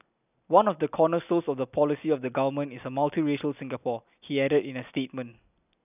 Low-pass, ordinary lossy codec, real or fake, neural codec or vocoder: 3.6 kHz; none; real; none